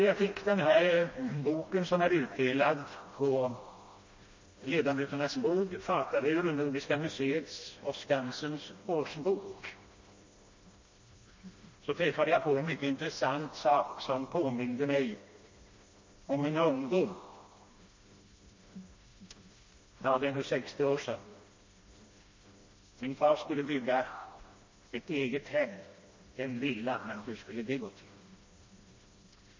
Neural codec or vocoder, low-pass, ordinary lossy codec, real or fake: codec, 16 kHz, 1 kbps, FreqCodec, smaller model; 7.2 kHz; MP3, 32 kbps; fake